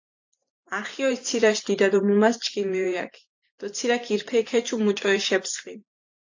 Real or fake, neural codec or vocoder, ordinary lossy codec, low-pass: fake; vocoder, 44.1 kHz, 128 mel bands every 512 samples, BigVGAN v2; AAC, 48 kbps; 7.2 kHz